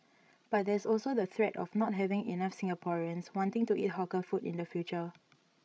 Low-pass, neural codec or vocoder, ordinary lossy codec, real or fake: none; codec, 16 kHz, 16 kbps, FreqCodec, larger model; none; fake